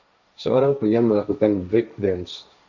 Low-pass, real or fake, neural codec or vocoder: 7.2 kHz; fake; codec, 16 kHz, 1.1 kbps, Voila-Tokenizer